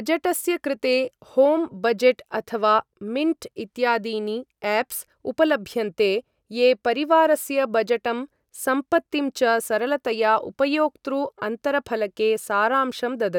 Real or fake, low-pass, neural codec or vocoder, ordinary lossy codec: real; 19.8 kHz; none; none